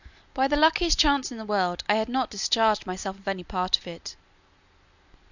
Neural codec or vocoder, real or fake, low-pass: none; real; 7.2 kHz